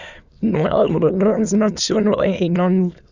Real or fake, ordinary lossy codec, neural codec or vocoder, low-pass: fake; Opus, 64 kbps; autoencoder, 22.05 kHz, a latent of 192 numbers a frame, VITS, trained on many speakers; 7.2 kHz